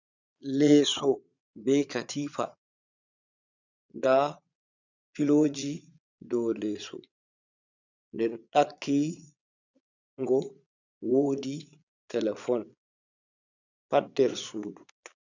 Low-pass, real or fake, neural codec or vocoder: 7.2 kHz; fake; vocoder, 22.05 kHz, 80 mel bands, Vocos